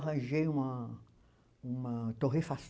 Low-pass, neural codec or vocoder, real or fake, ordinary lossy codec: none; none; real; none